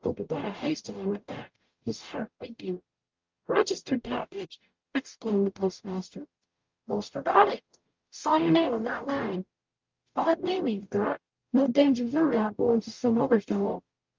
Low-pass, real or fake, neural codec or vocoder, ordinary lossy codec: 7.2 kHz; fake; codec, 44.1 kHz, 0.9 kbps, DAC; Opus, 24 kbps